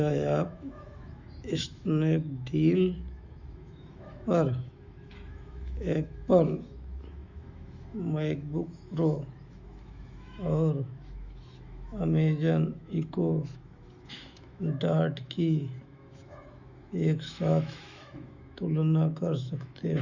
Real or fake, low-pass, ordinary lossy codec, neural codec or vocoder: fake; 7.2 kHz; Opus, 64 kbps; autoencoder, 48 kHz, 128 numbers a frame, DAC-VAE, trained on Japanese speech